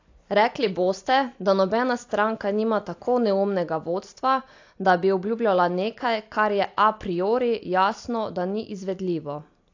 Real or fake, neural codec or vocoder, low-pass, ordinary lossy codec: real; none; 7.2 kHz; AAC, 48 kbps